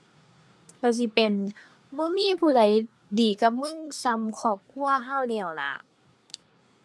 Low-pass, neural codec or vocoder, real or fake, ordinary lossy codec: none; codec, 24 kHz, 1 kbps, SNAC; fake; none